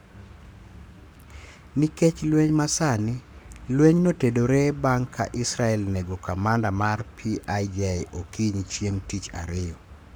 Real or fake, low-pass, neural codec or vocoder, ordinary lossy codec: fake; none; codec, 44.1 kHz, 7.8 kbps, Pupu-Codec; none